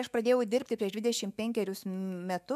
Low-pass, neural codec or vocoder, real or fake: 14.4 kHz; autoencoder, 48 kHz, 128 numbers a frame, DAC-VAE, trained on Japanese speech; fake